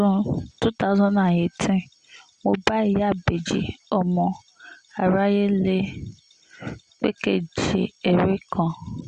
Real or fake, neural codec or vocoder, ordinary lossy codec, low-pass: real; none; Opus, 64 kbps; 9.9 kHz